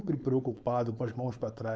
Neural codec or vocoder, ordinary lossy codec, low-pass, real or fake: codec, 16 kHz, 4.8 kbps, FACodec; none; none; fake